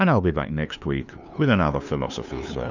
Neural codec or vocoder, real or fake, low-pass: codec, 16 kHz, 2 kbps, FunCodec, trained on LibriTTS, 25 frames a second; fake; 7.2 kHz